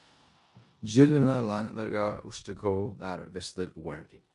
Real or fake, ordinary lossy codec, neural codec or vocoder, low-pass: fake; MP3, 64 kbps; codec, 16 kHz in and 24 kHz out, 0.9 kbps, LongCat-Audio-Codec, four codebook decoder; 10.8 kHz